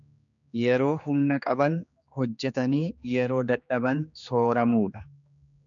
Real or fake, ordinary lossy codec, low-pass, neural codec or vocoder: fake; MP3, 96 kbps; 7.2 kHz; codec, 16 kHz, 2 kbps, X-Codec, HuBERT features, trained on general audio